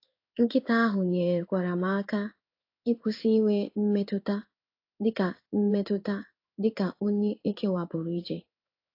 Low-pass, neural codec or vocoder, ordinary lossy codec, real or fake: 5.4 kHz; codec, 16 kHz in and 24 kHz out, 1 kbps, XY-Tokenizer; AAC, 32 kbps; fake